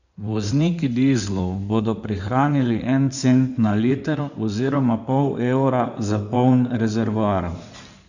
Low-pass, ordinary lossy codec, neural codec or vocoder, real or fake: 7.2 kHz; none; codec, 16 kHz in and 24 kHz out, 2.2 kbps, FireRedTTS-2 codec; fake